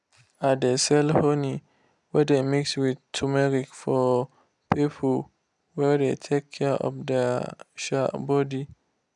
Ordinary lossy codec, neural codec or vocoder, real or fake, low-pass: none; none; real; 10.8 kHz